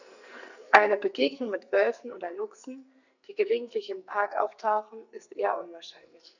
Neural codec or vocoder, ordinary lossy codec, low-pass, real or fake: codec, 44.1 kHz, 2.6 kbps, SNAC; none; 7.2 kHz; fake